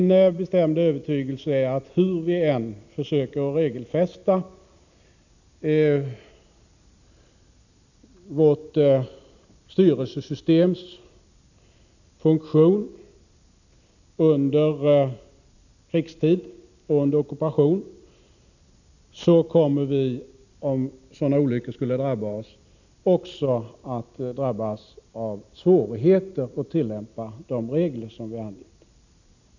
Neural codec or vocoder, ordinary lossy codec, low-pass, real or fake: none; none; 7.2 kHz; real